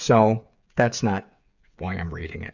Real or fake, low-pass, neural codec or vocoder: fake; 7.2 kHz; codec, 16 kHz, 8 kbps, FreqCodec, smaller model